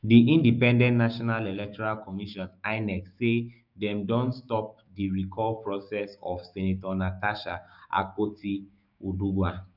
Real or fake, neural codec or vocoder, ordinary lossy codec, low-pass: fake; codec, 16 kHz, 6 kbps, DAC; none; 5.4 kHz